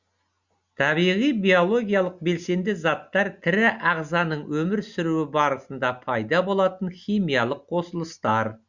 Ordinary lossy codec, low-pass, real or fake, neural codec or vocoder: Opus, 64 kbps; 7.2 kHz; real; none